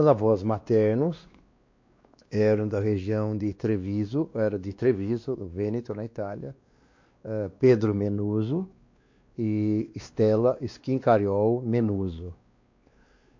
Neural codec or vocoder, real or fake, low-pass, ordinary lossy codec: codec, 16 kHz, 2 kbps, X-Codec, WavLM features, trained on Multilingual LibriSpeech; fake; 7.2 kHz; MP3, 48 kbps